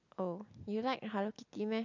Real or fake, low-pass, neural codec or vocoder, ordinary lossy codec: real; 7.2 kHz; none; none